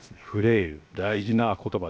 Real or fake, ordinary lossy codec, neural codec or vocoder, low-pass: fake; none; codec, 16 kHz, 0.7 kbps, FocalCodec; none